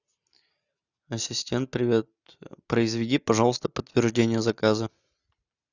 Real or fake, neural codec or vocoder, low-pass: real; none; 7.2 kHz